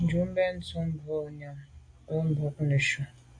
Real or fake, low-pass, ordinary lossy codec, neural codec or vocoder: real; 9.9 kHz; AAC, 48 kbps; none